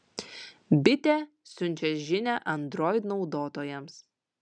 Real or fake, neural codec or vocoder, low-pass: real; none; 9.9 kHz